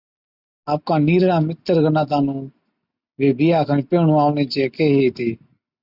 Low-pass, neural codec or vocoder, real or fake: 5.4 kHz; none; real